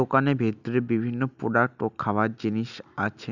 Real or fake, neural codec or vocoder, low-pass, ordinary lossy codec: real; none; 7.2 kHz; none